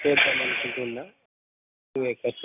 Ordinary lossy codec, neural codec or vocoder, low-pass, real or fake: none; none; 3.6 kHz; real